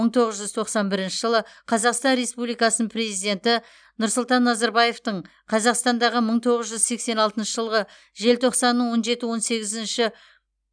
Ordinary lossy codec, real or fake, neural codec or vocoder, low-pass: none; real; none; 9.9 kHz